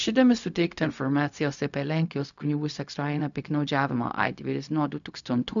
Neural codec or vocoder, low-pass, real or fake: codec, 16 kHz, 0.4 kbps, LongCat-Audio-Codec; 7.2 kHz; fake